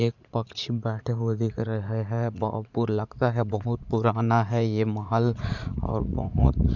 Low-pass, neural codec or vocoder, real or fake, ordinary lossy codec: 7.2 kHz; vocoder, 44.1 kHz, 80 mel bands, Vocos; fake; none